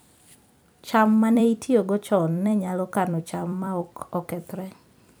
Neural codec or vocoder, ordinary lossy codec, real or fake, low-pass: vocoder, 44.1 kHz, 128 mel bands every 256 samples, BigVGAN v2; none; fake; none